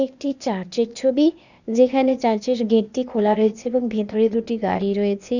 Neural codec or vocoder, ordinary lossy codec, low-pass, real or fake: codec, 16 kHz, 0.8 kbps, ZipCodec; none; 7.2 kHz; fake